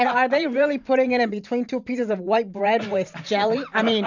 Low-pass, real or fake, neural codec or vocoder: 7.2 kHz; fake; vocoder, 44.1 kHz, 128 mel bands every 512 samples, BigVGAN v2